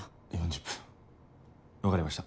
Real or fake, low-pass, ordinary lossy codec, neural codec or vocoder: real; none; none; none